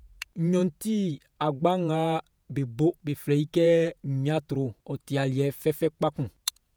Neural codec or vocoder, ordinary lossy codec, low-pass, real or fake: vocoder, 48 kHz, 128 mel bands, Vocos; none; none; fake